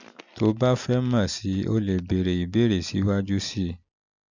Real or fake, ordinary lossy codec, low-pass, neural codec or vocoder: real; none; 7.2 kHz; none